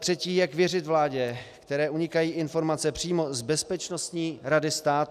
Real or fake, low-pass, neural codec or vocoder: real; 14.4 kHz; none